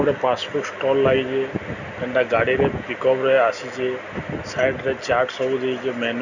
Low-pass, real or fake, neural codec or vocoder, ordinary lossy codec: 7.2 kHz; real; none; none